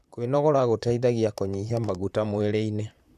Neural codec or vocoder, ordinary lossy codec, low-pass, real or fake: vocoder, 44.1 kHz, 128 mel bands, Pupu-Vocoder; none; 14.4 kHz; fake